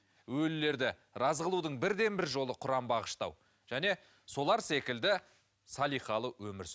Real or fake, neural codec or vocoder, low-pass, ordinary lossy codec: real; none; none; none